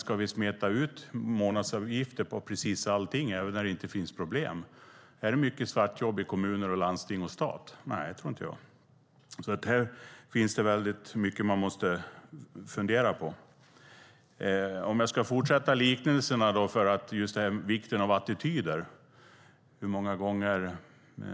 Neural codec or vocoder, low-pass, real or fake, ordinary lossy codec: none; none; real; none